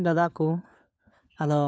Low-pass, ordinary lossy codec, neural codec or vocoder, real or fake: none; none; codec, 16 kHz, 4 kbps, FunCodec, trained on LibriTTS, 50 frames a second; fake